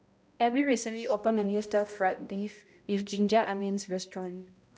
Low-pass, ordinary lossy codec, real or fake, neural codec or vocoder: none; none; fake; codec, 16 kHz, 0.5 kbps, X-Codec, HuBERT features, trained on balanced general audio